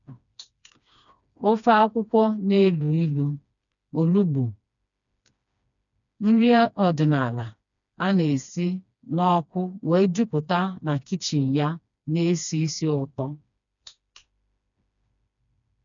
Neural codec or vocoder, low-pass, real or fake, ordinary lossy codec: codec, 16 kHz, 2 kbps, FreqCodec, smaller model; 7.2 kHz; fake; none